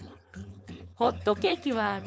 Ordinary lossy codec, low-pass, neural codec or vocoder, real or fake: none; none; codec, 16 kHz, 4.8 kbps, FACodec; fake